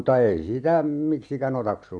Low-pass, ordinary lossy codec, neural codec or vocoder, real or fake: 9.9 kHz; none; none; real